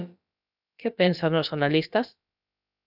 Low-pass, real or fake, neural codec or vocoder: 5.4 kHz; fake; codec, 16 kHz, about 1 kbps, DyCAST, with the encoder's durations